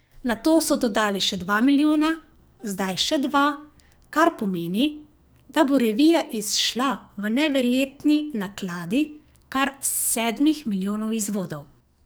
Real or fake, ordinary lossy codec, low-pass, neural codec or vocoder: fake; none; none; codec, 44.1 kHz, 2.6 kbps, SNAC